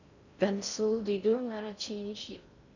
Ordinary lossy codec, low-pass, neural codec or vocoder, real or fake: none; 7.2 kHz; codec, 16 kHz in and 24 kHz out, 0.6 kbps, FocalCodec, streaming, 4096 codes; fake